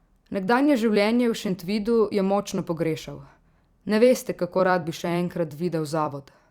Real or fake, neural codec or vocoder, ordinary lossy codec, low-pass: fake; vocoder, 44.1 kHz, 128 mel bands every 256 samples, BigVGAN v2; Opus, 64 kbps; 19.8 kHz